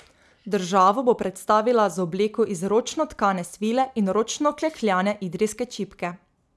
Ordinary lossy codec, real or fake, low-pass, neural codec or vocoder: none; real; none; none